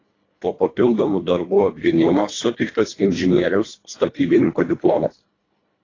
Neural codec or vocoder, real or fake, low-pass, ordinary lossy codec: codec, 24 kHz, 1.5 kbps, HILCodec; fake; 7.2 kHz; AAC, 32 kbps